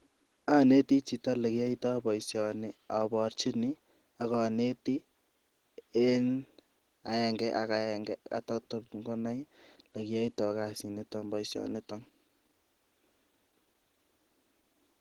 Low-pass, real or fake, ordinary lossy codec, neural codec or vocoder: 19.8 kHz; real; Opus, 16 kbps; none